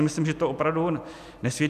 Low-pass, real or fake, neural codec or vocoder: 14.4 kHz; real; none